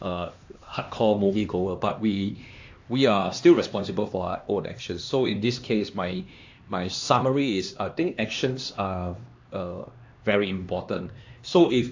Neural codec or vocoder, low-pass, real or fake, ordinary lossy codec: codec, 16 kHz, 2 kbps, X-Codec, HuBERT features, trained on LibriSpeech; 7.2 kHz; fake; AAC, 48 kbps